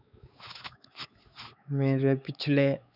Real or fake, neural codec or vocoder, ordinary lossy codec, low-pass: fake; codec, 16 kHz, 4 kbps, X-Codec, WavLM features, trained on Multilingual LibriSpeech; none; 5.4 kHz